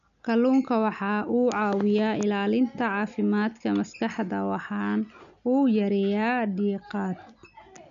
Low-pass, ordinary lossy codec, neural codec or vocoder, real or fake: 7.2 kHz; none; none; real